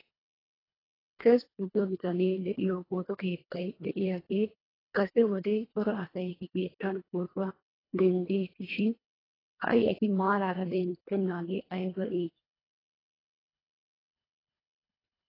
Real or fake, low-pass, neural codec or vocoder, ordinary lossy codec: fake; 5.4 kHz; codec, 24 kHz, 1.5 kbps, HILCodec; AAC, 24 kbps